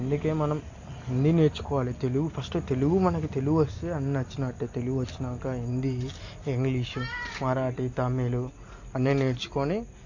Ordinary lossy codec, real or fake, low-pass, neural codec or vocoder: AAC, 48 kbps; real; 7.2 kHz; none